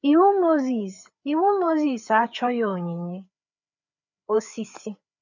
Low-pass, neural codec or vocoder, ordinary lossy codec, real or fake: 7.2 kHz; codec, 16 kHz, 4 kbps, FreqCodec, larger model; none; fake